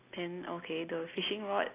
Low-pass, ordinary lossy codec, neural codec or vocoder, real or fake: 3.6 kHz; AAC, 24 kbps; none; real